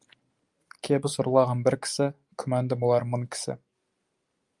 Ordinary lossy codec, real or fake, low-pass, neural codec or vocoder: Opus, 32 kbps; real; 10.8 kHz; none